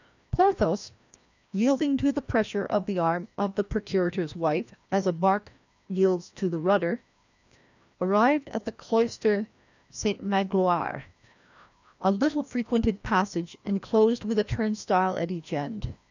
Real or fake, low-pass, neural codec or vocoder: fake; 7.2 kHz; codec, 16 kHz, 1 kbps, FreqCodec, larger model